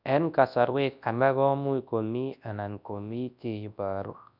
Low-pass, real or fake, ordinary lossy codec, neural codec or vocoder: 5.4 kHz; fake; none; codec, 24 kHz, 0.9 kbps, WavTokenizer, large speech release